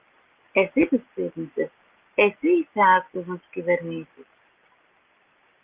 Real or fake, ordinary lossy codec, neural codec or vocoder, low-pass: real; Opus, 32 kbps; none; 3.6 kHz